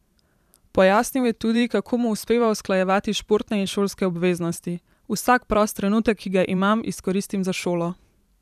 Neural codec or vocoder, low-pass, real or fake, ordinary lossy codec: vocoder, 44.1 kHz, 128 mel bands every 512 samples, BigVGAN v2; 14.4 kHz; fake; none